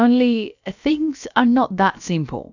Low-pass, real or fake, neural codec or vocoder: 7.2 kHz; fake; codec, 16 kHz, about 1 kbps, DyCAST, with the encoder's durations